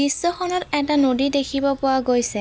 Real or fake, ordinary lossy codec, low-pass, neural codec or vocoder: real; none; none; none